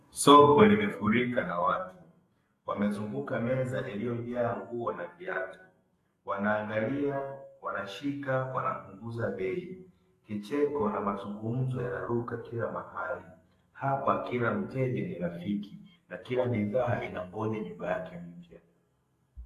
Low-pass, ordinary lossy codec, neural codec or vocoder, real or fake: 14.4 kHz; AAC, 48 kbps; codec, 44.1 kHz, 2.6 kbps, SNAC; fake